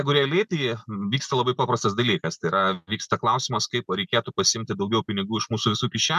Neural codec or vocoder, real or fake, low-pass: none; real; 14.4 kHz